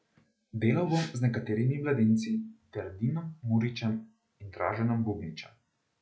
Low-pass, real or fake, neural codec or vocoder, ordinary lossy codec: none; real; none; none